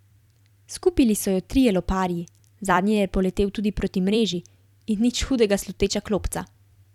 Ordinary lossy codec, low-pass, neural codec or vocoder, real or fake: none; 19.8 kHz; vocoder, 44.1 kHz, 128 mel bands every 256 samples, BigVGAN v2; fake